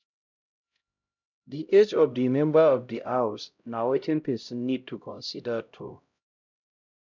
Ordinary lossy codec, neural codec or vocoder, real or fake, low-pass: none; codec, 16 kHz, 0.5 kbps, X-Codec, HuBERT features, trained on LibriSpeech; fake; 7.2 kHz